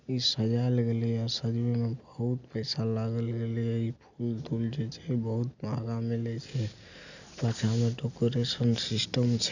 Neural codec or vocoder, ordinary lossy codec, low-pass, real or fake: none; none; 7.2 kHz; real